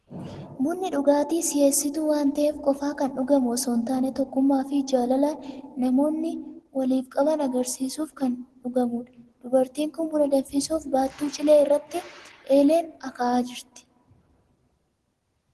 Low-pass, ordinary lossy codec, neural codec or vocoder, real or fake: 10.8 kHz; Opus, 16 kbps; none; real